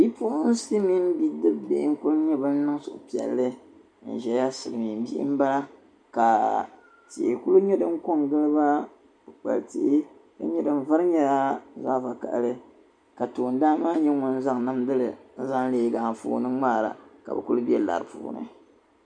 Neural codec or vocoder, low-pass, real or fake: none; 9.9 kHz; real